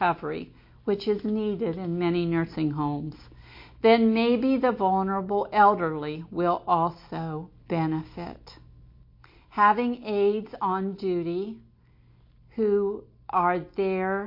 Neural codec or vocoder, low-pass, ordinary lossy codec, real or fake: none; 5.4 kHz; MP3, 32 kbps; real